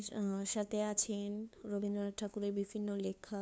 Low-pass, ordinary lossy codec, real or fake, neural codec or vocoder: none; none; fake; codec, 16 kHz, 2 kbps, FunCodec, trained on LibriTTS, 25 frames a second